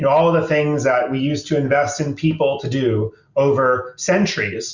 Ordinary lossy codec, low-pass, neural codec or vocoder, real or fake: Opus, 64 kbps; 7.2 kHz; none; real